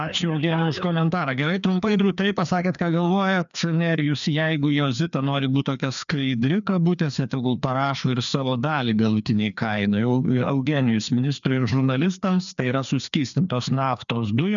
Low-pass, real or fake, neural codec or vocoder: 7.2 kHz; fake; codec, 16 kHz, 2 kbps, FreqCodec, larger model